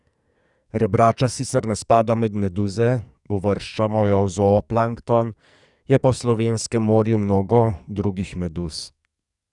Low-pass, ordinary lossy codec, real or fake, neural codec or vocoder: 10.8 kHz; none; fake; codec, 44.1 kHz, 2.6 kbps, SNAC